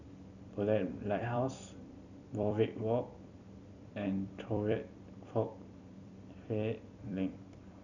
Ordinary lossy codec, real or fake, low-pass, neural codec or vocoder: none; fake; 7.2 kHz; vocoder, 44.1 kHz, 128 mel bands every 256 samples, BigVGAN v2